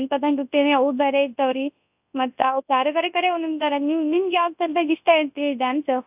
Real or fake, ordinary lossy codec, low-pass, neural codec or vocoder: fake; AAC, 32 kbps; 3.6 kHz; codec, 24 kHz, 0.9 kbps, WavTokenizer, large speech release